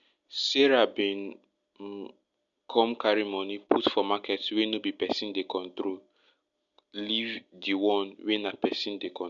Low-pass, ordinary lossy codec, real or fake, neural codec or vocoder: 7.2 kHz; none; real; none